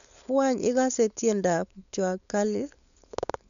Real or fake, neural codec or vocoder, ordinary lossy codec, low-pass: fake; codec, 16 kHz, 4.8 kbps, FACodec; none; 7.2 kHz